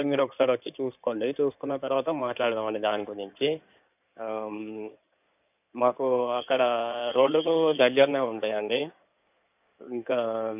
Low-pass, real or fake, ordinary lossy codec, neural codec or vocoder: 3.6 kHz; fake; none; codec, 16 kHz in and 24 kHz out, 2.2 kbps, FireRedTTS-2 codec